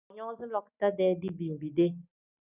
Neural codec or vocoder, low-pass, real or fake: none; 3.6 kHz; real